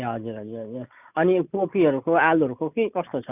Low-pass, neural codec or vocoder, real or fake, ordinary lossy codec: 3.6 kHz; none; real; none